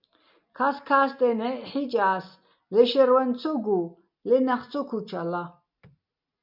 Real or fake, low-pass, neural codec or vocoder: real; 5.4 kHz; none